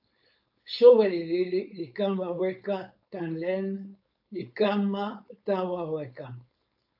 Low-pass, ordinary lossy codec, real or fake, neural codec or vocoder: 5.4 kHz; AAC, 48 kbps; fake; codec, 16 kHz, 4.8 kbps, FACodec